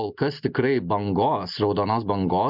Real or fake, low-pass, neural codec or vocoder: real; 5.4 kHz; none